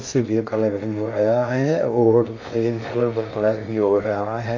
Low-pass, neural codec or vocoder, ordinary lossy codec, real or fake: 7.2 kHz; codec, 16 kHz in and 24 kHz out, 0.6 kbps, FocalCodec, streaming, 2048 codes; AAC, 48 kbps; fake